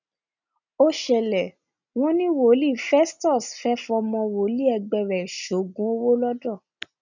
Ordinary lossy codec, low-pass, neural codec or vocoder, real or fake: none; 7.2 kHz; none; real